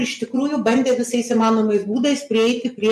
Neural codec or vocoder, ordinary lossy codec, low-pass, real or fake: none; MP3, 64 kbps; 14.4 kHz; real